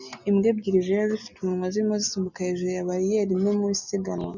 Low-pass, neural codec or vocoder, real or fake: 7.2 kHz; none; real